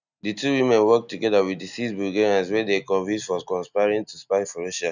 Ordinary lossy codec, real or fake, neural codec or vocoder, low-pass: none; real; none; 7.2 kHz